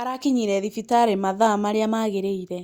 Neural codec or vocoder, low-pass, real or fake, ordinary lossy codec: none; 19.8 kHz; real; Opus, 64 kbps